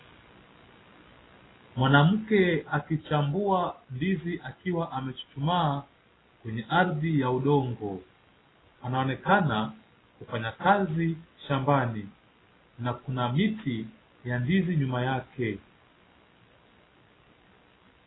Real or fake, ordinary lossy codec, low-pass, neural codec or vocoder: real; AAC, 16 kbps; 7.2 kHz; none